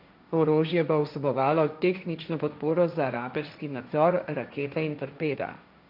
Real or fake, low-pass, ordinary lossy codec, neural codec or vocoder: fake; 5.4 kHz; none; codec, 16 kHz, 1.1 kbps, Voila-Tokenizer